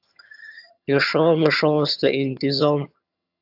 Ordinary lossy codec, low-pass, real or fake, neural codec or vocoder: AAC, 48 kbps; 5.4 kHz; fake; vocoder, 22.05 kHz, 80 mel bands, HiFi-GAN